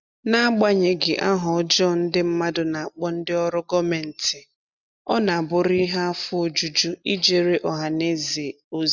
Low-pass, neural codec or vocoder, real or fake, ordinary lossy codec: 7.2 kHz; none; real; none